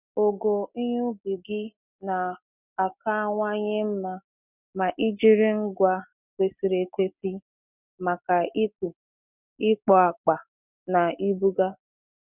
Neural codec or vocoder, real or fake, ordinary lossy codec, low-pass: none; real; none; 3.6 kHz